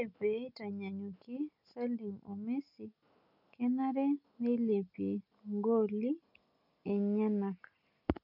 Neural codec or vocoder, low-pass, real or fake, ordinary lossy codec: none; 5.4 kHz; real; none